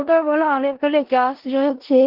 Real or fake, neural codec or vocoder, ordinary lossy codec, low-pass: fake; codec, 16 kHz in and 24 kHz out, 0.4 kbps, LongCat-Audio-Codec, four codebook decoder; Opus, 16 kbps; 5.4 kHz